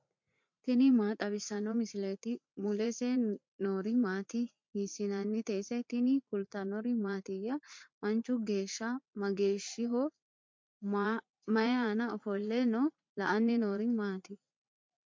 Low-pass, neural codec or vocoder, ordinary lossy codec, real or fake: 7.2 kHz; vocoder, 44.1 kHz, 80 mel bands, Vocos; MP3, 48 kbps; fake